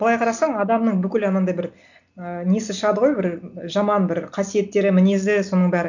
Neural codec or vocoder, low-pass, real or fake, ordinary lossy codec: none; 7.2 kHz; real; none